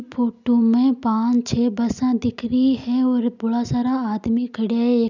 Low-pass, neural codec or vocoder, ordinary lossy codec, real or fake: 7.2 kHz; none; none; real